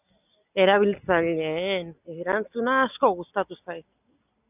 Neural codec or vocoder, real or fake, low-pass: none; real; 3.6 kHz